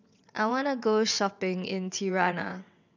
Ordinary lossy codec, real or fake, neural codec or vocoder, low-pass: none; fake; vocoder, 22.05 kHz, 80 mel bands, WaveNeXt; 7.2 kHz